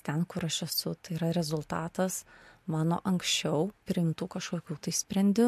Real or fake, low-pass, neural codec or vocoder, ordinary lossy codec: real; 14.4 kHz; none; MP3, 64 kbps